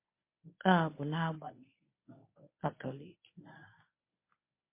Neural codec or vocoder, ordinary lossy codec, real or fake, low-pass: codec, 24 kHz, 0.9 kbps, WavTokenizer, medium speech release version 1; MP3, 24 kbps; fake; 3.6 kHz